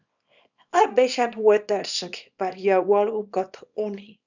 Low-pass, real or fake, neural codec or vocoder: 7.2 kHz; fake; codec, 24 kHz, 0.9 kbps, WavTokenizer, small release